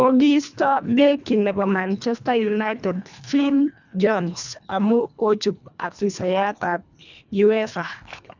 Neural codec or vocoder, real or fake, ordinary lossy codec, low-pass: codec, 24 kHz, 1.5 kbps, HILCodec; fake; none; 7.2 kHz